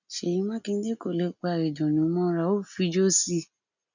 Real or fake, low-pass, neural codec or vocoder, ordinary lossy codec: real; 7.2 kHz; none; none